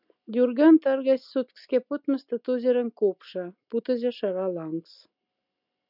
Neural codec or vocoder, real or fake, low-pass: none; real; 5.4 kHz